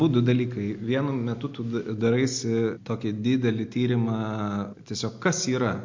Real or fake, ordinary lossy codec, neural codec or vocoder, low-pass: real; MP3, 48 kbps; none; 7.2 kHz